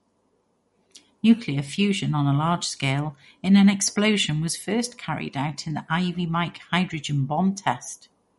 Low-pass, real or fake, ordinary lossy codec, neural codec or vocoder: 19.8 kHz; real; MP3, 48 kbps; none